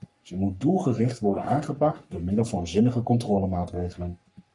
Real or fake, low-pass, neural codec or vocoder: fake; 10.8 kHz; codec, 44.1 kHz, 3.4 kbps, Pupu-Codec